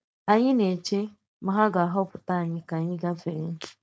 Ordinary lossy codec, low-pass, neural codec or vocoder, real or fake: none; none; codec, 16 kHz, 4.8 kbps, FACodec; fake